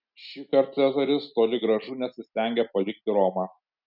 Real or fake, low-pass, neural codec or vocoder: real; 5.4 kHz; none